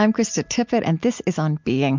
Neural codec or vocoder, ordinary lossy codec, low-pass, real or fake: none; MP3, 64 kbps; 7.2 kHz; real